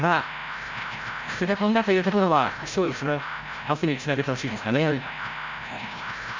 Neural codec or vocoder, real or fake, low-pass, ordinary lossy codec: codec, 16 kHz, 0.5 kbps, FreqCodec, larger model; fake; 7.2 kHz; MP3, 64 kbps